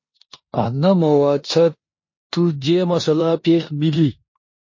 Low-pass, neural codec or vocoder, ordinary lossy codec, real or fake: 7.2 kHz; codec, 16 kHz in and 24 kHz out, 0.9 kbps, LongCat-Audio-Codec, four codebook decoder; MP3, 32 kbps; fake